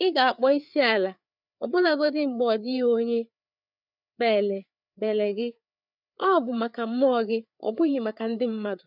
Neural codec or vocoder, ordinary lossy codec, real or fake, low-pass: codec, 16 kHz, 4 kbps, FreqCodec, larger model; none; fake; 5.4 kHz